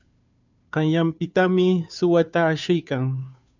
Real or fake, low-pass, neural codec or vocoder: fake; 7.2 kHz; codec, 16 kHz, 2 kbps, FunCodec, trained on Chinese and English, 25 frames a second